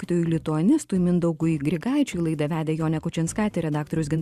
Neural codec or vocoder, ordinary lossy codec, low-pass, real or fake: vocoder, 44.1 kHz, 128 mel bands every 512 samples, BigVGAN v2; Opus, 64 kbps; 14.4 kHz; fake